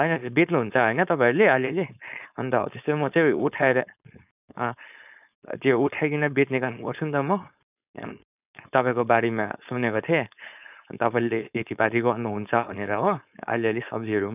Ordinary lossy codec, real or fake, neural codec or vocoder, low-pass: none; fake; codec, 16 kHz, 4.8 kbps, FACodec; 3.6 kHz